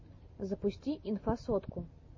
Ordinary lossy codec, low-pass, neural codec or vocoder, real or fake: MP3, 32 kbps; 7.2 kHz; none; real